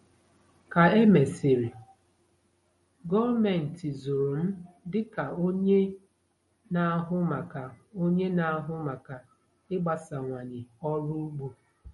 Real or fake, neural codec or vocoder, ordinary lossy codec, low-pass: real; none; MP3, 48 kbps; 19.8 kHz